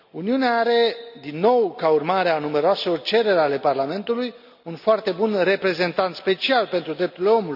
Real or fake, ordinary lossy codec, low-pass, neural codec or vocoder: real; none; 5.4 kHz; none